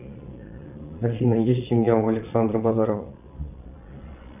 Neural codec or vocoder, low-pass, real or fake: vocoder, 22.05 kHz, 80 mel bands, Vocos; 3.6 kHz; fake